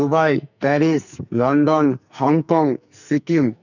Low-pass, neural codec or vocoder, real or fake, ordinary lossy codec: 7.2 kHz; codec, 44.1 kHz, 2.6 kbps, SNAC; fake; none